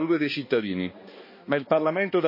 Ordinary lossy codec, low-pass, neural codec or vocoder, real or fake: MP3, 24 kbps; 5.4 kHz; codec, 16 kHz, 2 kbps, X-Codec, HuBERT features, trained on balanced general audio; fake